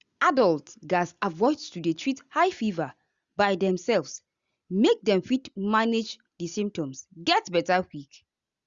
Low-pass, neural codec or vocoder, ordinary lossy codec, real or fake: 7.2 kHz; none; Opus, 64 kbps; real